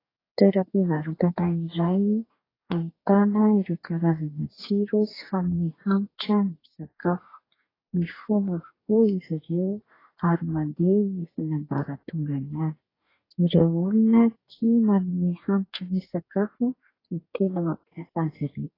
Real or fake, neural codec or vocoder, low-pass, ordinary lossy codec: fake; codec, 44.1 kHz, 2.6 kbps, DAC; 5.4 kHz; AAC, 24 kbps